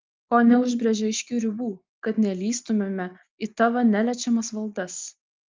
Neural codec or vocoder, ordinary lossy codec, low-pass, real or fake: none; Opus, 32 kbps; 7.2 kHz; real